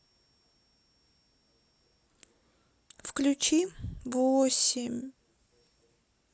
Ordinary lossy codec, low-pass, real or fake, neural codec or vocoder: none; none; real; none